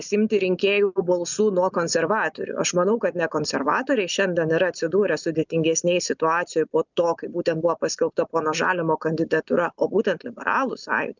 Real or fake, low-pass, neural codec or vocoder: real; 7.2 kHz; none